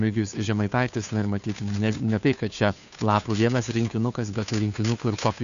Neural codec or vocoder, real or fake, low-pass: codec, 16 kHz, 2 kbps, FunCodec, trained on Chinese and English, 25 frames a second; fake; 7.2 kHz